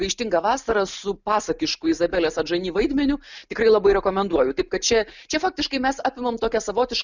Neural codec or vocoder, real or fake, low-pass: none; real; 7.2 kHz